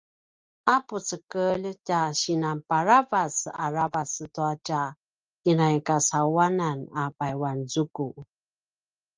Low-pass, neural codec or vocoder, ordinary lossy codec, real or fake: 7.2 kHz; none; Opus, 32 kbps; real